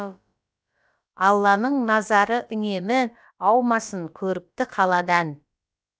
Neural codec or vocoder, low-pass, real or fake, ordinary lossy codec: codec, 16 kHz, about 1 kbps, DyCAST, with the encoder's durations; none; fake; none